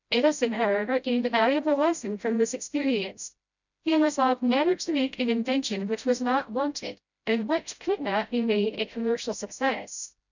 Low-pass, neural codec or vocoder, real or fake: 7.2 kHz; codec, 16 kHz, 0.5 kbps, FreqCodec, smaller model; fake